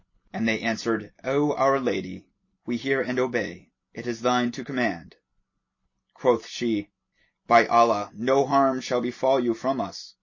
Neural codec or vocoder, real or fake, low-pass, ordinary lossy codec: none; real; 7.2 kHz; MP3, 32 kbps